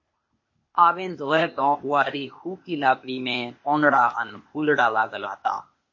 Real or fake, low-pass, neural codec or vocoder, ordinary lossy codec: fake; 7.2 kHz; codec, 16 kHz, 0.8 kbps, ZipCodec; MP3, 32 kbps